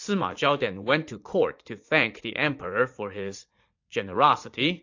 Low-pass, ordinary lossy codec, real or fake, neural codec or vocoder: 7.2 kHz; AAC, 48 kbps; fake; vocoder, 44.1 kHz, 80 mel bands, Vocos